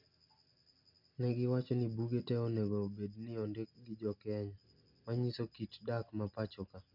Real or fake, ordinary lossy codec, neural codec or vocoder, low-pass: real; none; none; 5.4 kHz